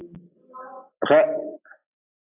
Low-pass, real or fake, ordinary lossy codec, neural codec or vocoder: 3.6 kHz; real; AAC, 32 kbps; none